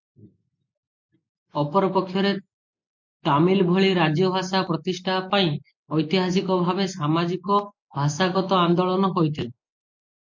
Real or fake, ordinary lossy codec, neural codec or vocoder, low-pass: real; MP3, 48 kbps; none; 7.2 kHz